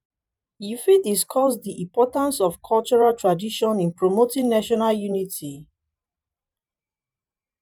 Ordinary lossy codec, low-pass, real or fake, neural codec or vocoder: none; 19.8 kHz; fake; vocoder, 44.1 kHz, 128 mel bands every 512 samples, BigVGAN v2